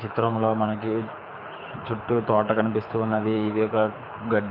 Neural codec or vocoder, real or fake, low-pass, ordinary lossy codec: codec, 24 kHz, 6 kbps, HILCodec; fake; 5.4 kHz; none